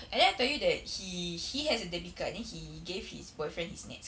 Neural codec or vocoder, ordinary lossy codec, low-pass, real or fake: none; none; none; real